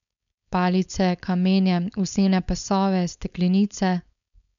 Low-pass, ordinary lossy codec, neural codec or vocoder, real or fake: 7.2 kHz; none; codec, 16 kHz, 4.8 kbps, FACodec; fake